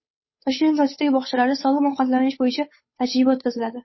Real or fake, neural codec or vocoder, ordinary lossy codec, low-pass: fake; codec, 16 kHz, 8 kbps, FunCodec, trained on Chinese and English, 25 frames a second; MP3, 24 kbps; 7.2 kHz